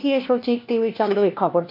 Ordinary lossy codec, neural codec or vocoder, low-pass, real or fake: MP3, 48 kbps; codec, 16 kHz, 1 kbps, FunCodec, trained on LibriTTS, 50 frames a second; 5.4 kHz; fake